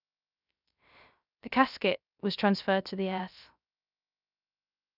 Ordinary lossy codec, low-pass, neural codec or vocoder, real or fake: none; 5.4 kHz; codec, 16 kHz, 0.3 kbps, FocalCodec; fake